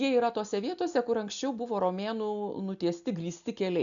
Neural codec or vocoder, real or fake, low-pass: none; real; 7.2 kHz